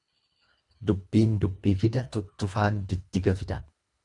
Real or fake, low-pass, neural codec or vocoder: fake; 10.8 kHz; codec, 24 kHz, 1.5 kbps, HILCodec